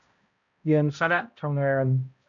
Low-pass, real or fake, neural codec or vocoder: 7.2 kHz; fake; codec, 16 kHz, 0.5 kbps, X-Codec, HuBERT features, trained on balanced general audio